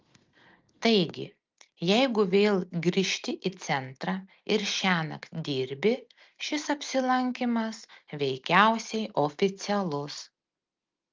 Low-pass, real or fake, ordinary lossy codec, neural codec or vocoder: 7.2 kHz; real; Opus, 24 kbps; none